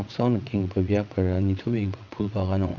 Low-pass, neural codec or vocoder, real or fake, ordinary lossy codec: 7.2 kHz; vocoder, 22.05 kHz, 80 mel bands, WaveNeXt; fake; none